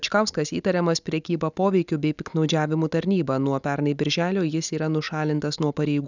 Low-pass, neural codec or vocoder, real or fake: 7.2 kHz; none; real